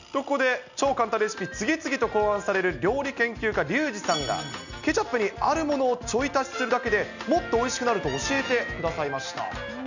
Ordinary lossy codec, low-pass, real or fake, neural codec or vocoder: none; 7.2 kHz; real; none